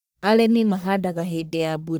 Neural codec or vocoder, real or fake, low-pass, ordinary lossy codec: codec, 44.1 kHz, 1.7 kbps, Pupu-Codec; fake; none; none